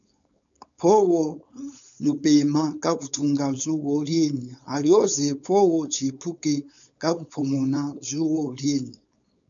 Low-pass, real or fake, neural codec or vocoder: 7.2 kHz; fake; codec, 16 kHz, 4.8 kbps, FACodec